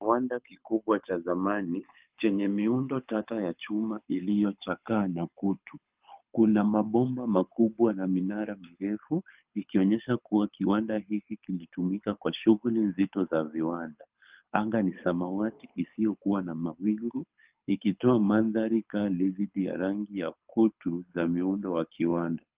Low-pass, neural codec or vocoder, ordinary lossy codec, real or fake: 3.6 kHz; codec, 24 kHz, 6 kbps, HILCodec; Opus, 32 kbps; fake